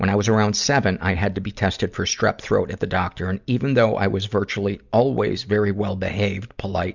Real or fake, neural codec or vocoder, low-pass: real; none; 7.2 kHz